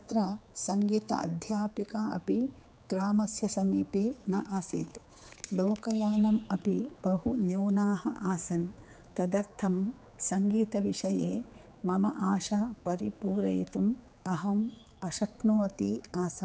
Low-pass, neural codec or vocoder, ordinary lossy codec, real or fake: none; codec, 16 kHz, 4 kbps, X-Codec, HuBERT features, trained on general audio; none; fake